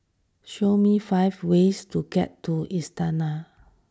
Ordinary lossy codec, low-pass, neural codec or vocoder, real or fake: none; none; none; real